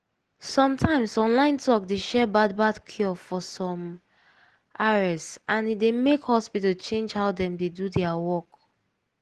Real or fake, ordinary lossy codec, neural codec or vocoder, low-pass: real; Opus, 16 kbps; none; 10.8 kHz